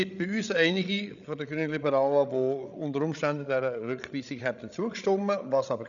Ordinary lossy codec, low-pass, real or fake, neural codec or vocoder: none; 7.2 kHz; fake; codec, 16 kHz, 16 kbps, FreqCodec, larger model